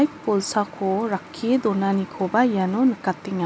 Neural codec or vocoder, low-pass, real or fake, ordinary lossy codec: none; none; real; none